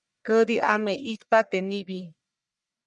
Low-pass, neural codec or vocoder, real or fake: 10.8 kHz; codec, 44.1 kHz, 1.7 kbps, Pupu-Codec; fake